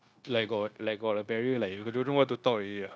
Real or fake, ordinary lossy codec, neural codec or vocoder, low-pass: fake; none; codec, 16 kHz, 0.9 kbps, LongCat-Audio-Codec; none